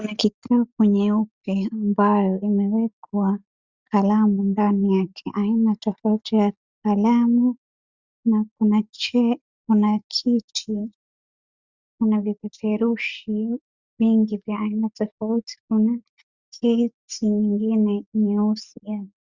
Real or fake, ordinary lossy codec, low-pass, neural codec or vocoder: real; Opus, 64 kbps; 7.2 kHz; none